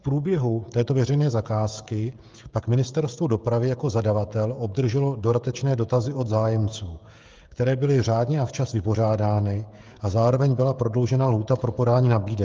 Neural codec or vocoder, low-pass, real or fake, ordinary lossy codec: codec, 16 kHz, 16 kbps, FreqCodec, smaller model; 7.2 kHz; fake; Opus, 32 kbps